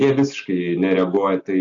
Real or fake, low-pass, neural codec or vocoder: real; 7.2 kHz; none